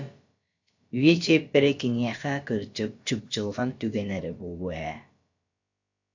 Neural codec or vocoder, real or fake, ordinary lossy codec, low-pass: codec, 16 kHz, about 1 kbps, DyCAST, with the encoder's durations; fake; AAC, 48 kbps; 7.2 kHz